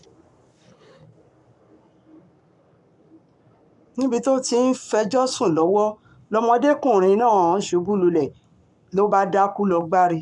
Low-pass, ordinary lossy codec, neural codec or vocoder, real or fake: 10.8 kHz; none; codec, 44.1 kHz, 7.8 kbps, Pupu-Codec; fake